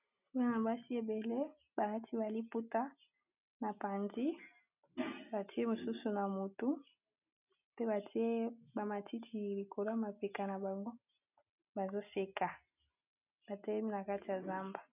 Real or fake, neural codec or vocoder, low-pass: real; none; 3.6 kHz